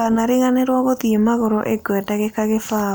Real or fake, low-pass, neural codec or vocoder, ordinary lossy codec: real; none; none; none